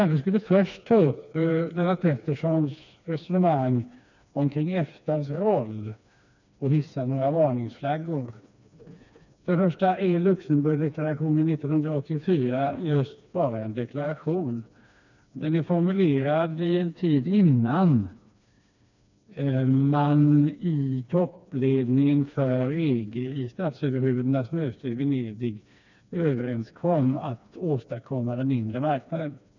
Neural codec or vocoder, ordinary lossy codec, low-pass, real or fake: codec, 16 kHz, 2 kbps, FreqCodec, smaller model; none; 7.2 kHz; fake